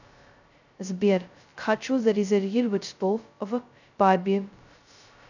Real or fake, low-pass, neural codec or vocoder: fake; 7.2 kHz; codec, 16 kHz, 0.2 kbps, FocalCodec